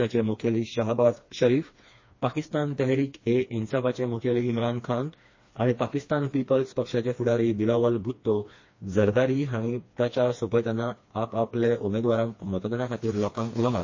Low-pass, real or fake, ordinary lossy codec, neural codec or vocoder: 7.2 kHz; fake; MP3, 32 kbps; codec, 16 kHz, 2 kbps, FreqCodec, smaller model